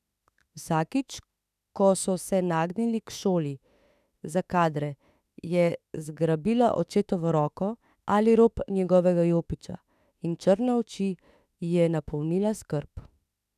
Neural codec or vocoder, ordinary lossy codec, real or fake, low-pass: autoencoder, 48 kHz, 32 numbers a frame, DAC-VAE, trained on Japanese speech; none; fake; 14.4 kHz